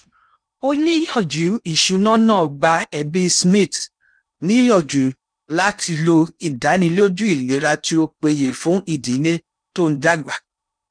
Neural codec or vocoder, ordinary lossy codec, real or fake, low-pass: codec, 16 kHz in and 24 kHz out, 0.8 kbps, FocalCodec, streaming, 65536 codes; none; fake; 9.9 kHz